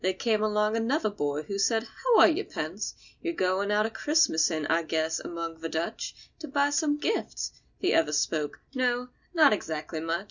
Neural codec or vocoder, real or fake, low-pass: none; real; 7.2 kHz